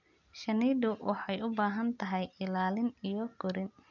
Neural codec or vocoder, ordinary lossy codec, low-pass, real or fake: none; none; 7.2 kHz; real